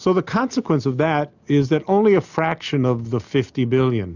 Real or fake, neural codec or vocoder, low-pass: real; none; 7.2 kHz